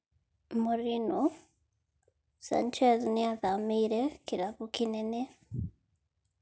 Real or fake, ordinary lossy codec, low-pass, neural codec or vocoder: real; none; none; none